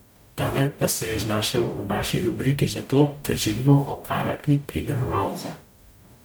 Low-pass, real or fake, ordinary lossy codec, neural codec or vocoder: none; fake; none; codec, 44.1 kHz, 0.9 kbps, DAC